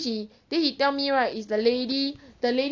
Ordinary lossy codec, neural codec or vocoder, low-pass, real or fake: AAC, 48 kbps; none; 7.2 kHz; real